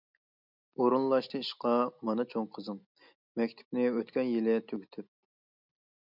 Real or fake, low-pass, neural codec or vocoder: real; 5.4 kHz; none